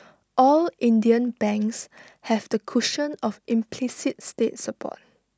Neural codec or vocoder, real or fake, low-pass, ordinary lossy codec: none; real; none; none